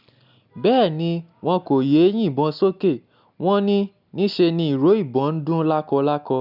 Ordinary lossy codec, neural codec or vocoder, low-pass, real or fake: none; none; 5.4 kHz; real